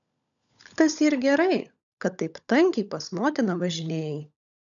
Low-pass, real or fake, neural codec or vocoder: 7.2 kHz; fake; codec, 16 kHz, 16 kbps, FunCodec, trained on LibriTTS, 50 frames a second